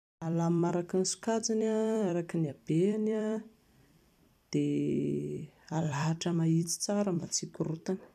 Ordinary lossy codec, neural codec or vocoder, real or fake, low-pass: none; vocoder, 44.1 kHz, 128 mel bands every 256 samples, BigVGAN v2; fake; 14.4 kHz